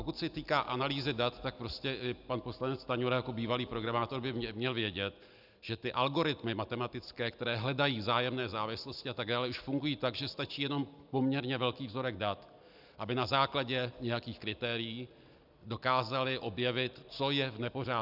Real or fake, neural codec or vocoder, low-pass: real; none; 5.4 kHz